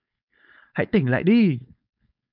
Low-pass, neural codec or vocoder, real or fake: 5.4 kHz; codec, 16 kHz, 4.8 kbps, FACodec; fake